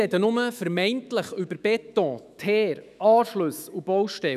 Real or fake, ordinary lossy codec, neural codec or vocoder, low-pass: fake; none; autoencoder, 48 kHz, 128 numbers a frame, DAC-VAE, trained on Japanese speech; 14.4 kHz